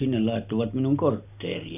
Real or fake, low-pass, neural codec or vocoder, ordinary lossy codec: real; 3.6 kHz; none; none